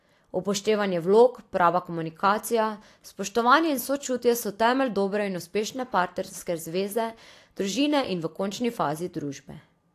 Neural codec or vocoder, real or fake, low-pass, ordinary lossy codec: none; real; 14.4 kHz; AAC, 64 kbps